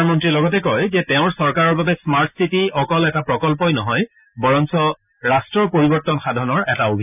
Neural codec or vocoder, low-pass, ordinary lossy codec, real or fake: none; 3.6 kHz; none; real